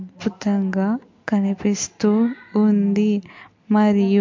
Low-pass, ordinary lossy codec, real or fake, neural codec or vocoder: 7.2 kHz; MP3, 48 kbps; real; none